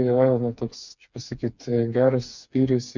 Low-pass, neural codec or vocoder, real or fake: 7.2 kHz; codec, 16 kHz, 4 kbps, FreqCodec, smaller model; fake